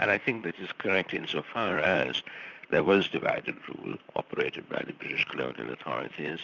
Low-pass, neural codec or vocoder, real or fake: 7.2 kHz; vocoder, 44.1 kHz, 128 mel bands, Pupu-Vocoder; fake